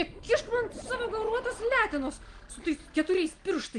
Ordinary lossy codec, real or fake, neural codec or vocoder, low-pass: Opus, 24 kbps; real; none; 9.9 kHz